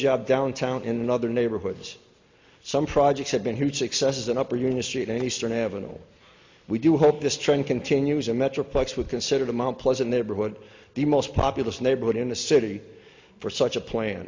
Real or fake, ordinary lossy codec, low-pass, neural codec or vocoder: real; MP3, 48 kbps; 7.2 kHz; none